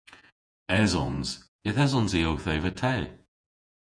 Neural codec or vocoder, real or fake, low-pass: vocoder, 48 kHz, 128 mel bands, Vocos; fake; 9.9 kHz